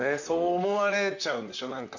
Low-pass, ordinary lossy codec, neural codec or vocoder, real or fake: 7.2 kHz; none; vocoder, 44.1 kHz, 128 mel bands, Pupu-Vocoder; fake